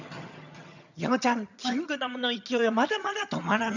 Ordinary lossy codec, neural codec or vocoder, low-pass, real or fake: none; vocoder, 22.05 kHz, 80 mel bands, HiFi-GAN; 7.2 kHz; fake